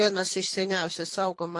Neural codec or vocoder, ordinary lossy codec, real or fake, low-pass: codec, 24 kHz, 3 kbps, HILCodec; AAC, 48 kbps; fake; 10.8 kHz